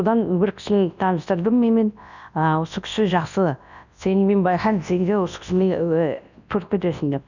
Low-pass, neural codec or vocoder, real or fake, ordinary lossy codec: 7.2 kHz; codec, 24 kHz, 0.9 kbps, WavTokenizer, large speech release; fake; none